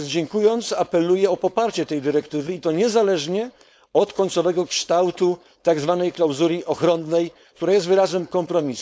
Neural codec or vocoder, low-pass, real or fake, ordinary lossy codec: codec, 16 kHz, 4.8 kbps, FACodec; none; fake; none